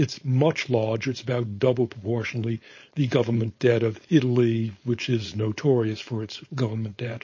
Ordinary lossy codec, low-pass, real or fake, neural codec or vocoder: MP3, 32 kbps; 7.2 kHz; fake; codec, 16 kHz, 4.8 kbps, FACodec